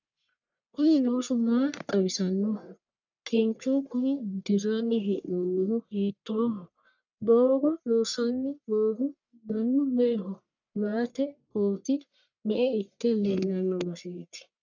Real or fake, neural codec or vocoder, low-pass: fake; codec, 44.1 kHz, 1.7 kbps, Pupu-Codec; 7.2 kHz